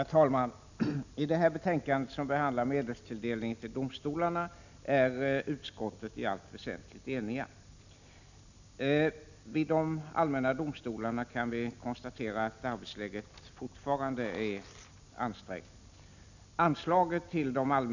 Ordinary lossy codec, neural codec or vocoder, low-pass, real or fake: none; none; 7.2 kHz; real